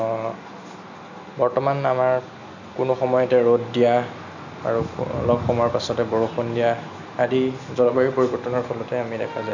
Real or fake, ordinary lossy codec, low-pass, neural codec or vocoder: real; none; 7.2 kHz; none